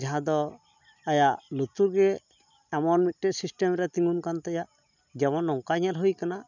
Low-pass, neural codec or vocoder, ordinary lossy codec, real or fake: 7.2 kHz; none; none; real